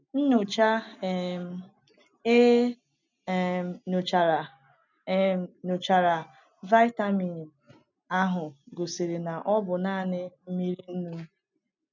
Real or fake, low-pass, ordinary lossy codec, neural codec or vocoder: real; 7.2 kHz; none; none